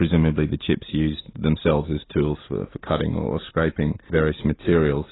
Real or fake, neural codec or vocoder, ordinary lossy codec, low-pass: real; none; AAC, 16 kbps; 7.2 kHz